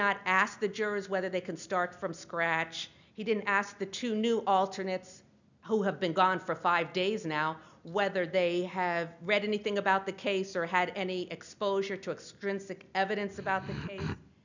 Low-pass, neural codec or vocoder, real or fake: 7.2 kHz; none; real